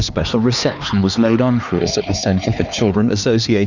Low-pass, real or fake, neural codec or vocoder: 7.2 kHz; fake; codec, 16 kHz, 2 kbps, X-Codec, HuBERT features, trained on LibriSpeech